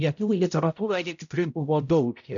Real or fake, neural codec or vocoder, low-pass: fake; codec, 16 kHz, 0.5 kbps, X-Codec, HuBERT features, trained on balanced general audio; 7.2 kHz